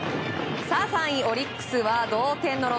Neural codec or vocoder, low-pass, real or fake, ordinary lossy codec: none; none; real; none